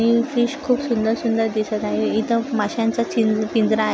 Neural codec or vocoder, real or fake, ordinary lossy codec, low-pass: none; real; none; none